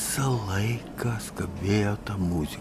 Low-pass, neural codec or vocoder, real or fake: 14.4 kHz; none; real